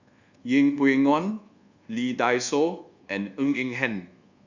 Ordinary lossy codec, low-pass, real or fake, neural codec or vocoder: Opus, 64 kbps; 7.2 kHz; fake; codec, 24 kHz, 1.2 kbps, DualCodec